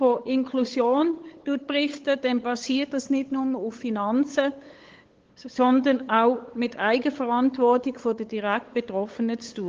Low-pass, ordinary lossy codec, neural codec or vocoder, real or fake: 7.2 kHz; Opus, 16 kbps; codec, 16 kHz, 8 kbps, FunCodec, trained on LibriTTS, 25 frames a second; fake